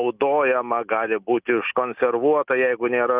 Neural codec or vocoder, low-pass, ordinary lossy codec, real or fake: none; 3.6 kHz; Opus, 24 kbps; real